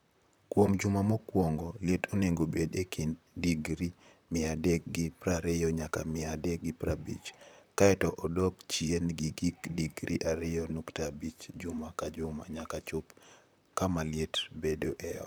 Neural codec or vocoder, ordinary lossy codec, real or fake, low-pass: vocoder, 44.1 kHz, 128 mel bands, Pupu-Vocoder; none; fake; none